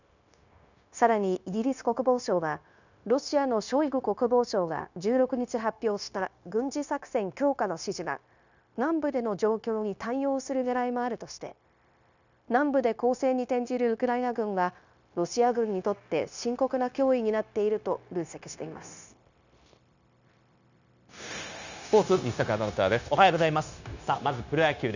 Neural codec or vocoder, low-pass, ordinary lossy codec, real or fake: codec, 16 kHz, 0.9 kbps, LongCat-Audio-Codec; 7.2 kHz; none; fake